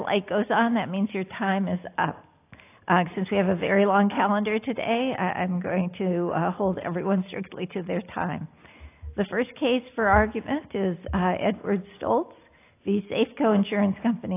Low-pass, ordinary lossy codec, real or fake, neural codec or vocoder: 3.6 kHz; AAC, 24 kbps; real; none